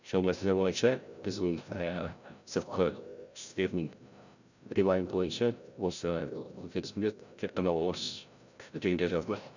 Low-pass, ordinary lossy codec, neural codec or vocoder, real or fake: 7.2 kHz; none; codec, 16 kHz, 0.5 kbps, FreqCodec, larger model; fake